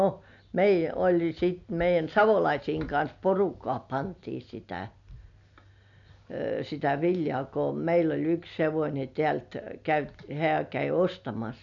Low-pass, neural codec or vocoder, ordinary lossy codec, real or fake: 7.2 kHz; none; none; real